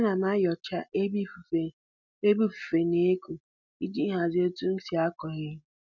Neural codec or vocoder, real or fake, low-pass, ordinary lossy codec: none; real; 7.2 kHz; none